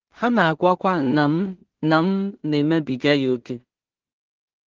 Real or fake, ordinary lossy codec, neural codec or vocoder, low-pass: fake; Opus, 16 kbps; codec, 16 kHz in and 24 kHz out, 0.4 kbps, LongCat-Audio-Codec, two codebook decoder; 7.2 kHz